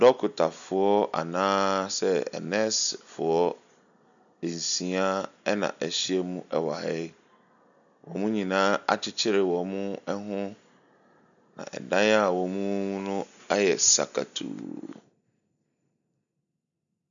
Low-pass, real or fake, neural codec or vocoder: 7.2 kHz; real; none